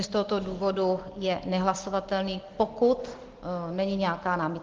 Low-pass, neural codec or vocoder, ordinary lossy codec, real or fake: 7.2 kHz; none; Opus, 16 kbps; real